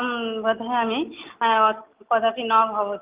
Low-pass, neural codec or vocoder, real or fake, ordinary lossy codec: 3.6 kHz; none; real; Opus, 32 kbps